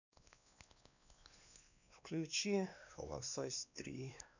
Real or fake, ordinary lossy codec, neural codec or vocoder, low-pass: fake; none; codec, 16 kHz, 2 kbps, X-Codec, WavLM features, trained on Multilingual LibriSpeech; 7.2 kHz